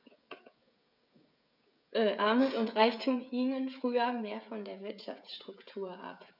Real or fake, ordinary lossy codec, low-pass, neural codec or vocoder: fake; none; 5.4 kHz; codec, 16 kHz, 16 kbps, FreqCodec, smaller model